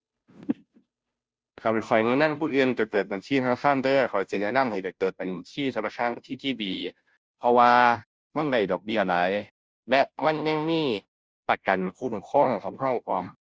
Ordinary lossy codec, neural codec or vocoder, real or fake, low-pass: none; codec, 16 kHz, 0.5 kbps, FunCodec, trained on Chinese and English, 25 frames a second; fake; none